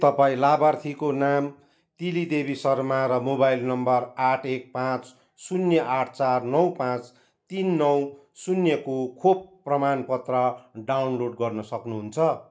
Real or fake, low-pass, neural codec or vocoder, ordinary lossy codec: real; none; none; none